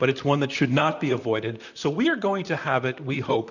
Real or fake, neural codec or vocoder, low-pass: fake; vocoder, 44.1 kHz, 128 mel bands, Pupu-Vocoder; 7.2 kHz